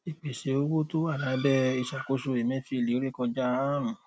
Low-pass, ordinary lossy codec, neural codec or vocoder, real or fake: none; none; none; real